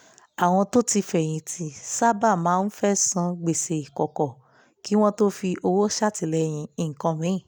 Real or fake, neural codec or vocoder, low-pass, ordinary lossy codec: real; none; none; none